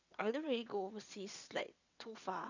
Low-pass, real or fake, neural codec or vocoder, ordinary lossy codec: 7.2 kHz; fake; vocoder, 22.05 kHz, 80 mel bands, WaveNeXt; none